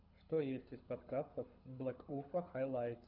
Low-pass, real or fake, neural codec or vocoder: 5.4 kHz; fake; codec, 24 kHz, 6 kbps, HILCodec